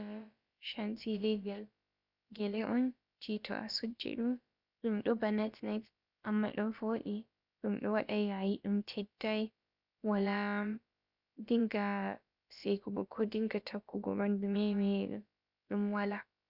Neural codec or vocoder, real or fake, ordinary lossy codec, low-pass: codec, 16 kHz, about 1 kbps, DyCAST, with the encoder's durations; fake; Opus, 64 kbps; 5.4 kHz